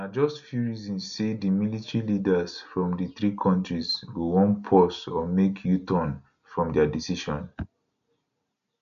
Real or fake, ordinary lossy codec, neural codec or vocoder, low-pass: real; none; none; 7.2 kHz